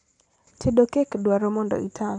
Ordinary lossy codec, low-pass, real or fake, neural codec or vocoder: none; 9.9 kHz; real; none